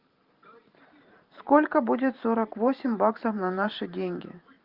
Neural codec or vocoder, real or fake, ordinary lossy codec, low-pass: vocoder, 44.1 kHz, 128 mel bands every 512 samples, BigVGAN v2; fake; Opus, 32 kbps; 5.4 kHz